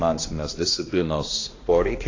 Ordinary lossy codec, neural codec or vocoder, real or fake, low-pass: AAC, 32 kbps; codec, 16 kHz, 1 kbps, X-Codec, HuBERT features, trained on balanced general audio; fake; 7.2 kHz